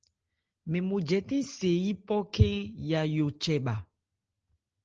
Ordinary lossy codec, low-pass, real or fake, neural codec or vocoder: Opus, 16 kbps; 7.2 kHz; real; none